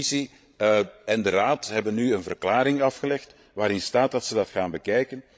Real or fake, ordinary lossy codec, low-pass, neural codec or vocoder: fake; none; none; codec, 16 kHz, 16 kbps, FreqCodec, larger model